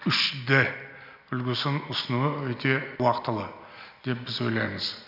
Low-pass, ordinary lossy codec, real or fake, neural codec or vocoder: 5.4 kHz; none; real; none